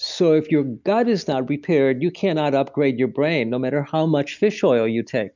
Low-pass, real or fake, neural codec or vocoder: 7.2 kHz; real; none